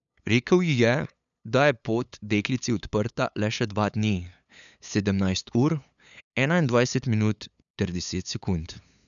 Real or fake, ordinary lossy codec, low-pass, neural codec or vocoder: fake; none; 7.2 kHz; codec, 16 kHz, 8 kbps, FunCodec, trained on LibriTTS, 25 frames a second